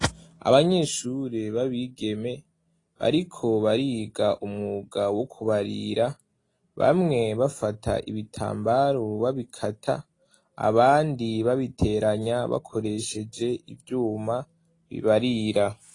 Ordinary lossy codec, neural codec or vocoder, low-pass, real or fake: AAC, 32 kbps; none; 10.8 kHz; real